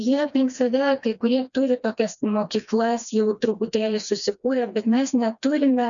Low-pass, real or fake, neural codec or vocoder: 7.2 kHz; fake; codec, 16 kHz, 2 kbps, FreqCodec, smaller model